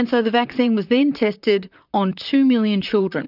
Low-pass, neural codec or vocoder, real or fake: 5.4 kHz; vocoder, 44.1 kHz, 128 mel bands, Pupu-Vocoder; fake